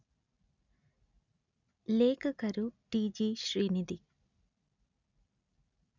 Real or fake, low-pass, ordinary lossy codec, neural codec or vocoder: real; 7.2 kHz; none; none